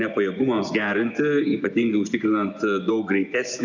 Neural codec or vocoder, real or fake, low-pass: vocoder, 24 kHz, 100 mel bands, Vocos; fake; 7.2 kHz